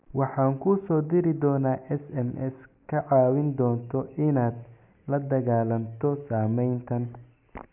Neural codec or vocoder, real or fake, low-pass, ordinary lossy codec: none; real; 3.6 kHz; none